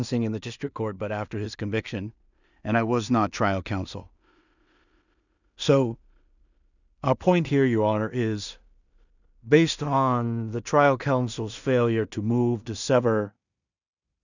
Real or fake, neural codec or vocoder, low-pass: fake; codec, 16 kHz in and 24 kHz out, 0.4 kbps, LongCat-Audio-Codec, two codebook decoder; 7.2 kHz